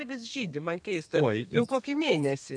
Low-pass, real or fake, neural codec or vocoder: 9.9 kHz; fake; codec, 32 kHz, 1.9 kbps, SNAC